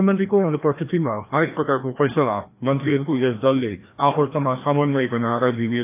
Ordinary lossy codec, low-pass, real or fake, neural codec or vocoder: AAC, 24 kbps; 3.6 kHz; fake; codec, 16 kHz, 1 kbps, FreqCodec, larger model